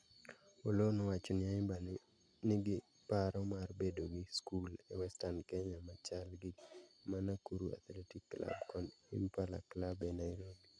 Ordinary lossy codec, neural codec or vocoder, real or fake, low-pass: none; none; real; 9.9 kHz